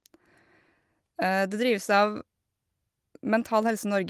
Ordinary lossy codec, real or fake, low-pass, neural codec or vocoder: Opus, 24 kbps; real; 14.4 kHz; none